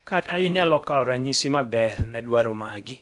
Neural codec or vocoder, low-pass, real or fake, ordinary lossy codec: codec, 16 kHz in and 24 kHz out, 0.8 kbps, FocalCodec, streaming, 65536 codes; 10.8 kHz; fake; none